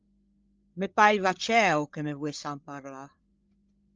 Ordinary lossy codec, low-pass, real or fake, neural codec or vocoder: Opus, 24 kbps; 7.2 kHz; fake; codec, 16 kHz, 8 kbps, FreqCodec, larger model